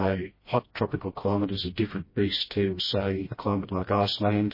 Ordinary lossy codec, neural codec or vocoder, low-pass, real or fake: MP3, 24 kbps; codec, 16 kHz, 1 kbps, FreqCodec, smaller model; 5.4 kHz; fake